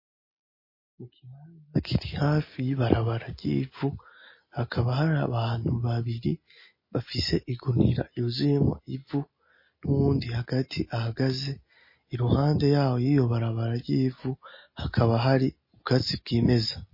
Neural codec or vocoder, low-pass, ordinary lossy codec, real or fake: none; 5.4 kHz; MP3, 24 kbps; real